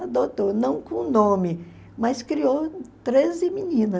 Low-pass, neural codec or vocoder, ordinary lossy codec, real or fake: none; none; none; real